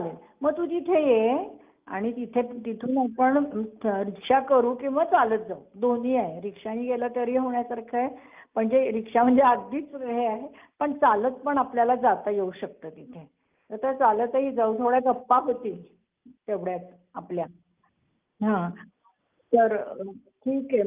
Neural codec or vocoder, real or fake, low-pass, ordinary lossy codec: none; real; 3.6 kHz; Opus, 24 kbps